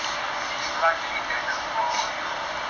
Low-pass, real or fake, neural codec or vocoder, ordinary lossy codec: 7.2 kHz; real; none; MP3, 64 kbps